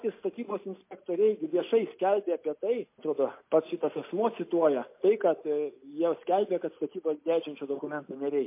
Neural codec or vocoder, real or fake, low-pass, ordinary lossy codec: none; real; 3.6 kHz; MP3, 24 kbps